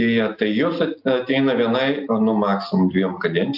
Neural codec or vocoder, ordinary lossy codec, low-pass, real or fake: none; AAC, 48 kbps; 5.4 kHz; real